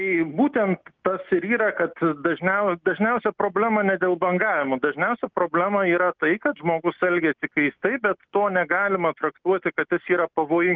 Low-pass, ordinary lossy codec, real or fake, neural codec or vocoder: 7.2 kHz; Opus, 32 kbps; real; none